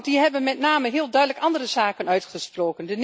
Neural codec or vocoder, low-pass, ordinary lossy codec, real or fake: none; none; none; real